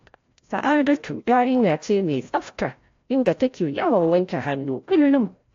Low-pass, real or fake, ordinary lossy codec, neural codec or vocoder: 7.2 kHz; fake; AAC, 48 kbps; codec, 16 kHz, 0.5 kbps, FreqCodec, larger model